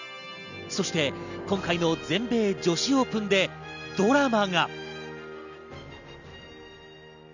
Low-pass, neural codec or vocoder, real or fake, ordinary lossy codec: 7.2 kHz; none; real; none